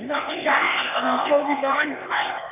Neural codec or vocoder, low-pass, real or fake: codec, 16 kHz, 0.8 kbps, ZipCodec; 3.6 kHz; fake